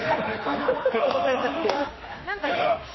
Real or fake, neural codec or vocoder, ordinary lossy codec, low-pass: fake; autoencoder, 48 kHz, 32 numbers a frame, DAC-VAE, trained on Japanese speech; MP3, 24 kbps; 7.2 kHz